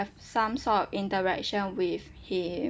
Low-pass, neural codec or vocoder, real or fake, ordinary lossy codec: none; none; real; none